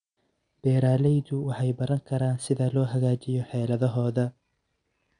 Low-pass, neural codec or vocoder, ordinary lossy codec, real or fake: 9.9 kHz; none; none; real